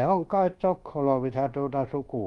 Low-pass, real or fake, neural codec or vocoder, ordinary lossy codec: 10.8 kHz; fake; codec, 24 kHz, 1.2 kbps, DualCodec; Opus, 24 kbps